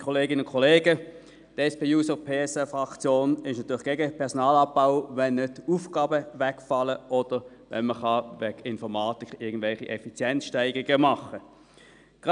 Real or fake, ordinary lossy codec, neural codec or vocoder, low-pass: real; none; none; 9.9 kHz